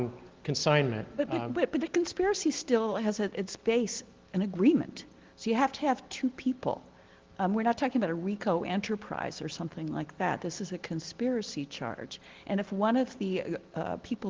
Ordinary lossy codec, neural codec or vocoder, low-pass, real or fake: Opus, 16 kbps; none; 7.2 kHz; real